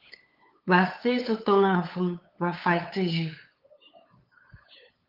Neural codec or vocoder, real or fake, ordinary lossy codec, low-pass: codec, 16 kHz, 8 kbps, FunCodec, trained on LibriTTS, 25 frames a second; fake; Opus, 24 kbps; 5.4 kHz